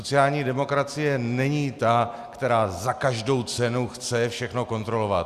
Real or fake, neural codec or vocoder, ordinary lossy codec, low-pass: real; none; Opus, 64 kbps; 14.4 kHz